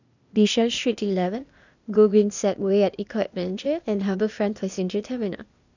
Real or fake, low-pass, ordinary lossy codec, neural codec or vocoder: fake; 7.2 kHz; none; codec, 16 kHz, 0.8 kbps, ZipCodec